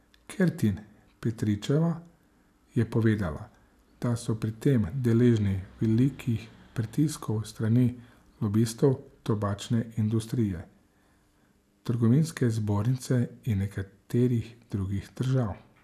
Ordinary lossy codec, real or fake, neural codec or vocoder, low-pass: none; real; none; 14.4 kHz